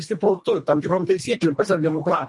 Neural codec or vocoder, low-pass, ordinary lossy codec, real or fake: codec, 24 kHz, 1.5 kbps, HILCodec; 10.8 kHz; MP3, 48 kbps; fake